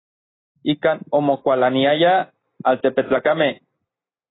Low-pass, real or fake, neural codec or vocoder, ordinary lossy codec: 7.2 kHz; real; none; AAC, 16 kbps